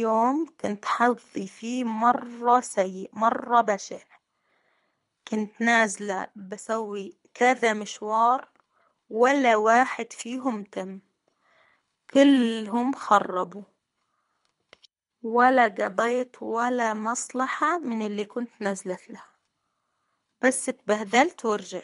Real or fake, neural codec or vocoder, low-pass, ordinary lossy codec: fake; codec, 24 kHz, 3 kbps, HILCodec; 10.8 kHz; MP3, 64 kbps